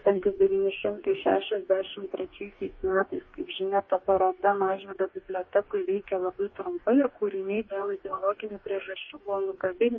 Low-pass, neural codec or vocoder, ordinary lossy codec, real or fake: 7.2 kHz; codec, 44.1 kHz, 2.6 kbps, DAC; MP3, 32 kbps; fake